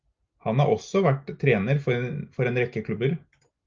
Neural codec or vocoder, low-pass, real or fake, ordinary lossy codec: none; 7.2 kHz; real; Opus, 24 kbps